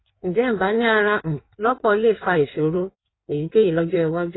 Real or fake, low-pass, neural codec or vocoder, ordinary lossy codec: fake; 7.2 kHz; codec, 16 kHz in and 24 kHz out, 1.1 kbps, FireRedTTS-2 codec; AAC, 16 kbps